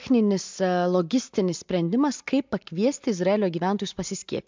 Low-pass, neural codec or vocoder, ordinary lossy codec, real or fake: 7.2 kHz; none; MP3, 64 kbps; real